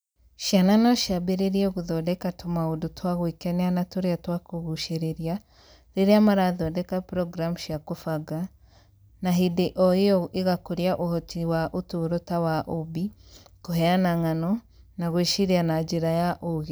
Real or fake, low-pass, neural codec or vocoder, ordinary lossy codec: real; none; none; none